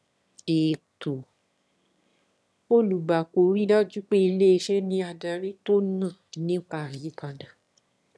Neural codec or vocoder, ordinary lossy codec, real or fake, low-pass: autoencoder, 22.05 kHz, a latent of 192 numbers a frame, VITS, trained on one speaker; none; fake; none